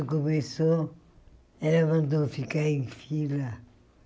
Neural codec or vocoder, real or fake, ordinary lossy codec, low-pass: none; real; none; none